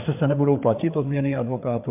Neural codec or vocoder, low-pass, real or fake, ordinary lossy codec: codec, 16 kHz, 4 kbps, FreqCodec, larger model; 3.6 kHz; fake; MP3, 32 kbps